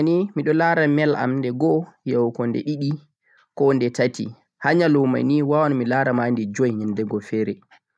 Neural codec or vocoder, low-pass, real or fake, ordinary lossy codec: none; none; real; none